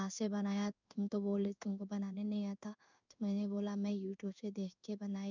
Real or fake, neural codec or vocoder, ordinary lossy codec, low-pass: fake; codec, 16 kHz in and 24 kHz out, 1 kbps, XY-Tokenizer; none; 7.2 kHz